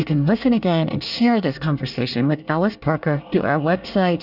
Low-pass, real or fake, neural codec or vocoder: 5.4 kHz; fake; codec, 24 kHz, 1 kbps, SNAC